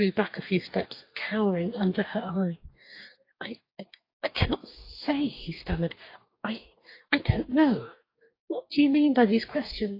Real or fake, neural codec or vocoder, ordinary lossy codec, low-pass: fake; codec, 44.1 kHz, 2.6 kbps, DAC; AAC, 32 kbps; 5.4 kHz